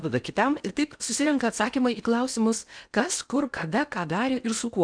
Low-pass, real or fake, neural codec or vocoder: 9.9 kHz; fake; codec, 16 kHz in and 24 kHz out, 0.8 kbps, FocalCodec, streaming, 65536 codes